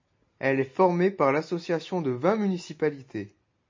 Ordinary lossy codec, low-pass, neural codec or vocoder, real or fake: MP3, 32 kbps; 7.2 kHz; none; real